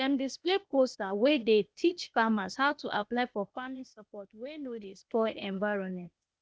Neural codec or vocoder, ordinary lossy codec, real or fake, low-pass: codec, 16 kHz, 0.8 kbps, ZipCodec; none; fake; none